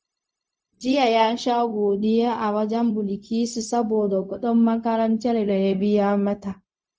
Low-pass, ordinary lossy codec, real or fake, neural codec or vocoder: none; none; fake; codec, 16 kHz, 0.4 kbps, LongCat-Audio-Codec